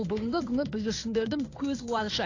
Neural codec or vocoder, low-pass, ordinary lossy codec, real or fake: codec, 16 kHz in and 24 kHz out, 1 kbps, XY-Tokenizer; 7.2 kHz; AAC, 48 kbps; fake